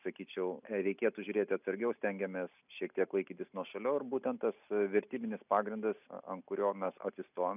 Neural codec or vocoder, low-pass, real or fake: none; 3.6 kHz; real